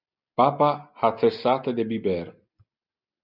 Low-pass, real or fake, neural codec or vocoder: 5.4 kHz; real; none